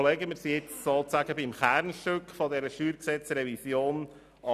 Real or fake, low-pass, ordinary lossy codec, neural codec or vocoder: real; 14.4 kHz; none; none